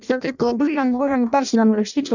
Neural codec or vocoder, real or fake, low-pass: codec, 16 kHz in and 24 kHz out, 0.6 kbps, FireRedTTS-2 codec; fake; 7.2 kHz